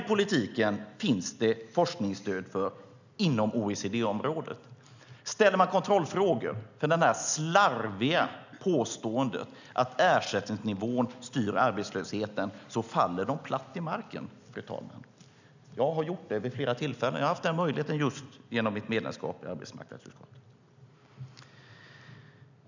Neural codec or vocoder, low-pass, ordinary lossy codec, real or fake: none; 7.2 kHz; none; real